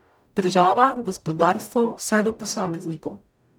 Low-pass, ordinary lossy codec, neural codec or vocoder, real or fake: none; none; codec, 44.1 kHz, 0.9 kbps, DAC; fake